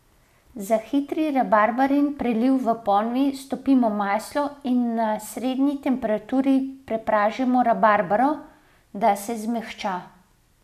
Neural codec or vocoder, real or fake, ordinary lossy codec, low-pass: none; real; none; 14.4 kHz